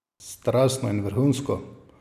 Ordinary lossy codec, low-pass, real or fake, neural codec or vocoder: none; 14.4 kHz; real; none